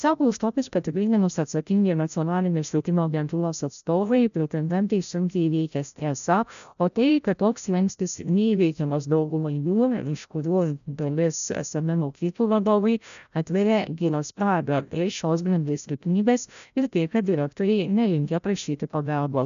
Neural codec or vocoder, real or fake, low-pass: codec, 16 kHz, 0.5 kbps, FreqCodec, larger model; fake; 7.2 kHz